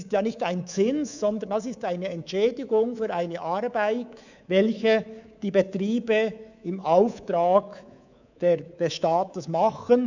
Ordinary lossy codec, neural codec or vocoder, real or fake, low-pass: none; codec, 24 kHz, 3.1 kbps, DualCodec; fake; 7.2 kHz